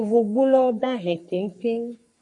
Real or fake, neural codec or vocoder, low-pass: fake; codec, 44.1 kHz, 3.4 kbps, Pupu-Codec; 10.8 kHz